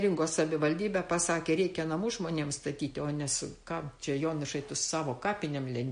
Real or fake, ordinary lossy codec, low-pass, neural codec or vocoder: real; MP3, 48 kbps; 9.9 kHz; none